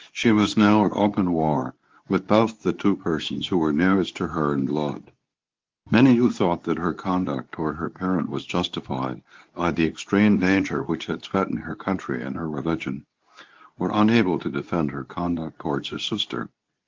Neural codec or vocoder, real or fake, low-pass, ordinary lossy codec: codec, 24 kHz, 0.9 kbps, WavTokenizer, medium speech release version 2; fake; 7.2 kHz; Opus, 24 kbps